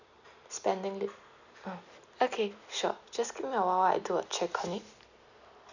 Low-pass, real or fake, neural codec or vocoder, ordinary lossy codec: 7.2 kHz; real; none; none